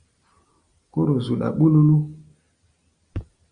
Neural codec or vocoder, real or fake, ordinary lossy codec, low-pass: none; real; Opus, 64 kbps; 9.9 kHz